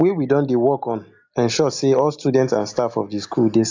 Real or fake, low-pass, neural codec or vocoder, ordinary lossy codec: real; 7.2 kHz; none; AAC, 48 kbps